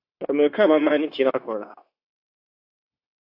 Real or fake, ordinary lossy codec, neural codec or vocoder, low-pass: fake; AAC, 48 kbps; codec, 16 kHz, 0.9 kbps, LongCat-Audio-Codec; 5.4 kHz